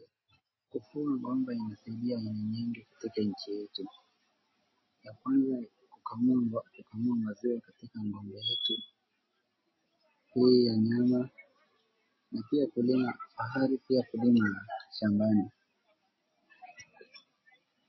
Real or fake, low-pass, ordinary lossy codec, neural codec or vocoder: real; 7.2 kHz; MP3, 24 kbps; none